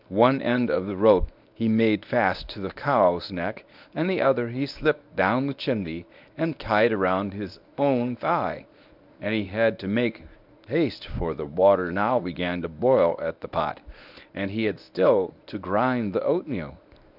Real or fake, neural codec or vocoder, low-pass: fake; codec, 24 kHz, 0.9 kbps, WavTokenizer, medium speech release version 1; 5.4 kHz